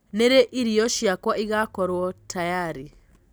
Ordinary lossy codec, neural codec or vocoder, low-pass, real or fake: none; none; none; real